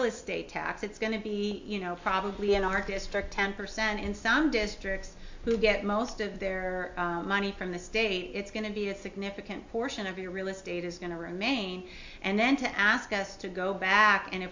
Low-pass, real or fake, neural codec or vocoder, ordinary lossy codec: 7.2 kHz; real; none; MP3, 48 kbps